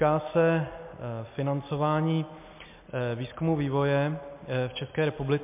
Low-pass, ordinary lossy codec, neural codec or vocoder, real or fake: 3.6 kHz; MP3, 32 kbps; none; real